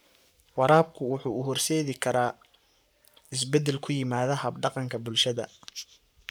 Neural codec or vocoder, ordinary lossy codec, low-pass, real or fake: codec, 44.1 kHz, 7.8 kbps, Pupu-Codec; none; none; fake